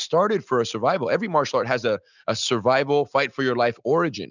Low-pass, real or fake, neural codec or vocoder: 7.2 kHz; real; none